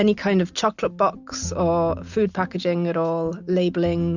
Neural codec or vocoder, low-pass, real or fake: none; 7.2 kHz; real